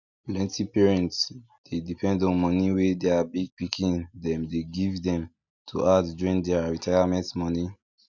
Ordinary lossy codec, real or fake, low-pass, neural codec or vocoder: none; real; 7.2 kHz; none